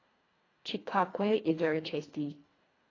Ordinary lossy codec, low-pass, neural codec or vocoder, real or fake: AAC, 32 kbps; 7.2 kHz; codec, 24 kHz, 1.5 kbps, HILCodec; fake